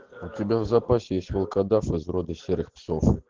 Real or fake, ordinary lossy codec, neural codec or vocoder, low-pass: real; Opus, 16 kbps; none; 7.2 kHz